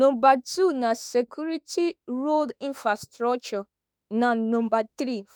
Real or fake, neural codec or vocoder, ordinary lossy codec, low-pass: fake; autoencoder, 48 kHz, 32 numbers a frame, DAC-VAE, trained on Japanese speech; none; none